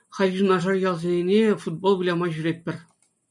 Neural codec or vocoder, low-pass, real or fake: none; 10.8 kHz; real